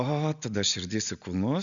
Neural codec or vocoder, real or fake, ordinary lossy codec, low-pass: none; real; MP3, 96 kbps; 7.2 kHz